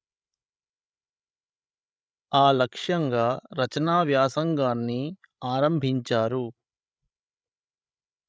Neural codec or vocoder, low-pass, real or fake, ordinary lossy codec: codec, 16 kHz, 16 kbps, FreqCodec, larger model; none; fake; none